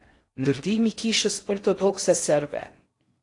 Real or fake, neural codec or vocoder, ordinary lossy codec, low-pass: fake; codec, 16 kHz in and 24 kHz out, 0.6 kbps, FocalCodec, streaming, 4096 codes; AAC, 48 kbps; 10.8 kHz